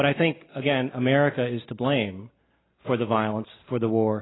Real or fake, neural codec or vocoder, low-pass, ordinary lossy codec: real; none; 7.2 kHz; AAC, 16 kbps